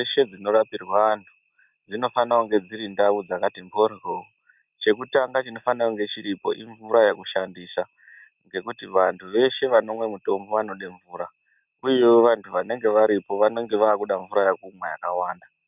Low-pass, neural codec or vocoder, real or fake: 3.6 kHz; none; real